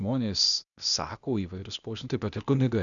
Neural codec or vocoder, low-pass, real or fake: codec, 16 kHz, 0.8 kbps, ZipCodec; 7.2 kHz; fake